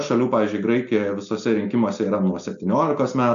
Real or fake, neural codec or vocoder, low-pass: real; none; 7.2 kHz